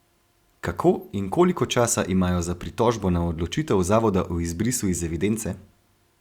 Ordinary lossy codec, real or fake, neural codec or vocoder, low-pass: Opus, 64 kbps; real; none; 19.8 kHz